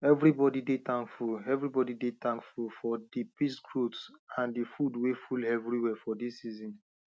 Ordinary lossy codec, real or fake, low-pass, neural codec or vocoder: none; real; none; none